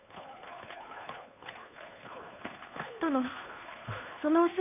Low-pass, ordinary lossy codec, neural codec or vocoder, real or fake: 3.6 kHz; none; codec, 16 kHz, 2 kbps, FunCodec, trained on Chinese and English, 25 frames a second; fake